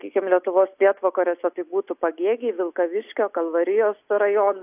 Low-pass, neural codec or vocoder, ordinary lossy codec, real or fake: 3.6 kHz; none; AAC, 32 kbps; real